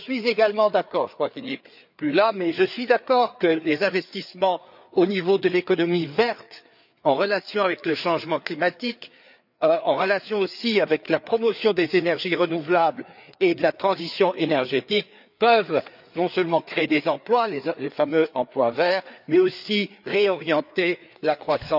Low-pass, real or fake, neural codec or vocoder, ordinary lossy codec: 5.4 kHz; fake; codec, 16 kHz, 4 kbps, FreqCodec, larger model; none